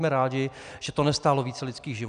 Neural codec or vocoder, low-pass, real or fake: none; 9.9 kHz; real